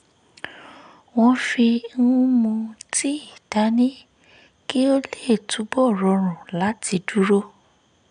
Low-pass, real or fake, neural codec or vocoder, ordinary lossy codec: 9.9 kHz; real; none; none